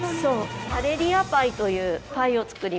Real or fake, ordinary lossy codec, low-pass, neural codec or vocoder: real; none; none; none